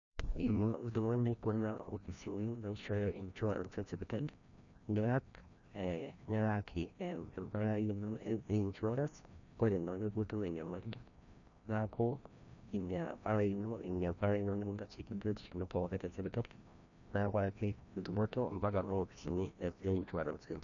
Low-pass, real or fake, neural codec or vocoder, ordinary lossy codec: 7.2 kHz; fake; codec, 16 kHz, 1 kbps, FreqCodec, larger model; none